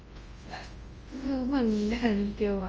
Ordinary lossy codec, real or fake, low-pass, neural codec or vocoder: Opus, 24 kbps; fake; 7.2 kHz; codec, 24 kHz, 0.9 kbps, WavTokenizer, large speech release